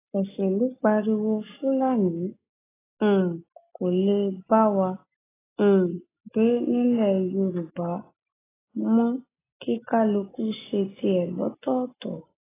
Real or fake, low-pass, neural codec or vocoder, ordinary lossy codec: real; 3.6 kHz; none; AAC, 16 kbps